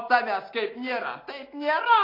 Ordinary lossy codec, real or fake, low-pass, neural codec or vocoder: AAC, 24 kbps; real; 5.4 kHz; none